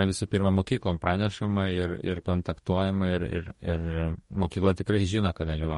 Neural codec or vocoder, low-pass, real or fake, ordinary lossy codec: codec, 44.1 kHz, 2.6 kbps, DAC; 19.8 kHz; fake; MP3, 48 kbps